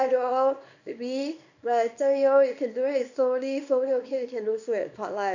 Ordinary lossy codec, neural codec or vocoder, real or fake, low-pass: none; codec, 24 kHz, 0.9 kbps, WavTokenizer, small release; fake; 7.2 kHz